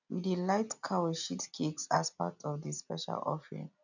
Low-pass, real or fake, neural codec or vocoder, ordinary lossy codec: 7.2 kHz; real; none; none